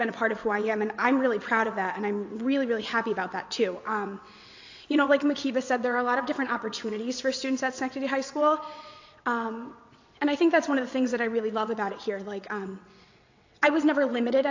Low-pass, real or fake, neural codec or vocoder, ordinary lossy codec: 7.2 kHz; fake; vocoder, 22.05 kHz, 80 mel bands, WaveNeXt; AAC, 48 kbps